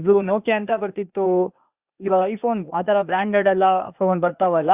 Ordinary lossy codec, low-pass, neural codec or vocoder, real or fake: Opus, 64 kbps; 3.6 kHz; codec, 16 kHz, 0.8 kbps, ZipCodec; fake